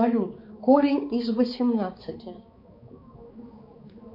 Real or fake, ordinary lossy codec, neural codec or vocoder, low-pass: fake; MP3, 32 kbps; codec, 16 kHz, 4 kbps, X-Codec, HuBERT features, trained on balanced general audio; 5.4 kHz